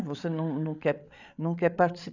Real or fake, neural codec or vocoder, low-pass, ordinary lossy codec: fake; codec, 16 kHz, 8 kbps, FreqCodec, larger model; 7.2 kHz; none